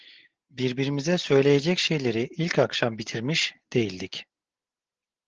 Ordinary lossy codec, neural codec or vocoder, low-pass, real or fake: Opus, 16 kbps; none; 7.2 kHz; real